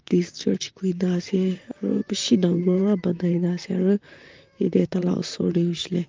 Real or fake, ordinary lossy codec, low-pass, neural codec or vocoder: fake; Opus, 24 kbps; 7.2 kHz; vocoder, 44.1 kHz, 128 mel bands, Pupu-Vocoder